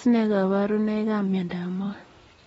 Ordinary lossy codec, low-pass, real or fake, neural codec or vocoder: AAC, 24 kbps; 19.8 kHz; real; none